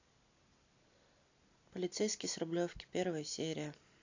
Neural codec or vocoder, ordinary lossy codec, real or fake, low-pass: none; AAC, 48 kbps; real; 7.2 kHz